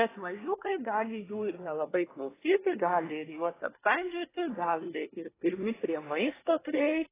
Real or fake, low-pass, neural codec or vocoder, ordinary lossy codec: fake; 3.6 kHz; codec, 24 kHz, 1 kbps, SNAC; AAC, 16 kbps